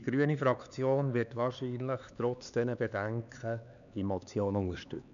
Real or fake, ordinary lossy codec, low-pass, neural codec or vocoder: fake; none; 7.2 kHz; codec, 16 kHz, 4 kbps, X-Codec, HuBERT features, trained on LibriSpeech